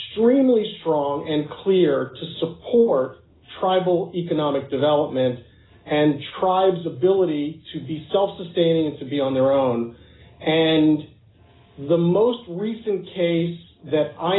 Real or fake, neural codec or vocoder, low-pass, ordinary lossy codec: real; none; 7.2 kHz; AAC, 16 kbps